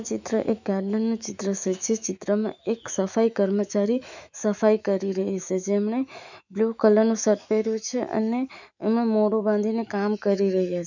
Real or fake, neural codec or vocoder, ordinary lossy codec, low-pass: fake; autoencoder, 48 kHz, 128 numbers a frame, DAC-VAE, trained on Japanese speech; none; 7.2 kHz